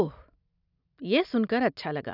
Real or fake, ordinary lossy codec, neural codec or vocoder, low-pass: real; none; none; 5.4 kHz